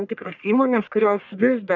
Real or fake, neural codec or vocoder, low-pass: fake; codec, 44.1 kHz, 1.7 kbps, Pupu-Codec; 7.2 kHz